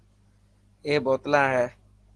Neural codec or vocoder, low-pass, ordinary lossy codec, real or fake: none; 10.8 kHz; Opus, 16 kbps; real